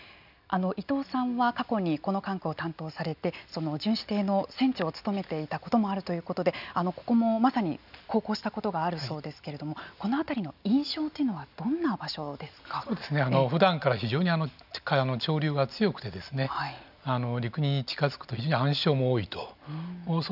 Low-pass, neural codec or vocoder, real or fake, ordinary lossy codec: 5.4 kHz; none; real; none